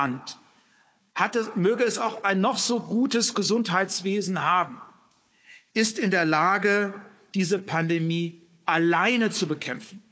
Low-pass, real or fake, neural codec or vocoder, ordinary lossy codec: none; fake; codec, 16 kHz, 4 kbps, FunCodec, trained on Chinese and English, 50 frames a second; none